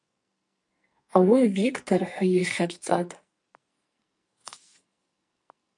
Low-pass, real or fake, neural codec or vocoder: 10.8 kHz; fake; codec, 44.1 kHz, 2.6 kbps, SNAC